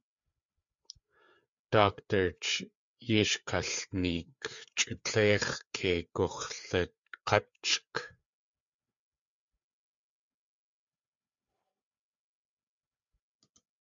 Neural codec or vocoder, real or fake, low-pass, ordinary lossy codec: codec, 16 kHz, 4 kbps, FreqCodec, larger model; fake; 7.2 kHz; MP3, 64 kbps